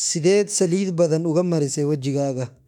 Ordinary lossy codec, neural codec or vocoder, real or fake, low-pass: none; autoencoder, 48 kHz, 32 numbers a frame, DAC-VAE, trained on Japanese speech; fake; 19.8 kHz